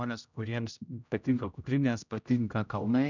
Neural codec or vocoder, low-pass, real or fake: codec, 16 kHz, 0.5 kbps, X-Codec, HuBERT features, trained on general audio; 7.2 kHz; fake